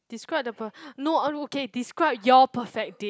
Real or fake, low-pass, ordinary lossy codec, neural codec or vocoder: real; none; none; none